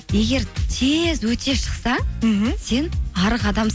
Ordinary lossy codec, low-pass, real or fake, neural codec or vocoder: none; none; real; none